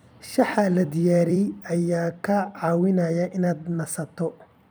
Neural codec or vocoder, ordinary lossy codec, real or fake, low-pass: vocoder, 44.1 kHz, 128 mel bands every 256 samples, BigVGAN v2; none; fake; none